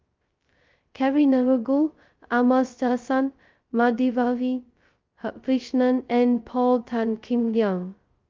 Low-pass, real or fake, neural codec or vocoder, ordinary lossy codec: 7.2 kHz; fake; codec, 16 kHz, 0.2 kbps, FocalCodec; Opus, 32 kbps